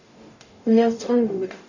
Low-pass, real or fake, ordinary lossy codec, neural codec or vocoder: 7.2 kHz; fake; none; codec, 44.1 kHz, 0.9 kbps, DAC